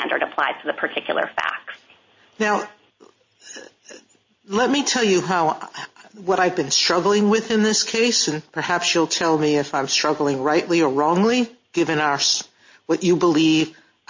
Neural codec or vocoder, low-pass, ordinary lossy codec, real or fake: none; 7.2 kHz; MP3, 32 kbps; real